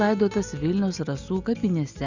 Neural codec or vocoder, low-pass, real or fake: none; 7.2 kHz; real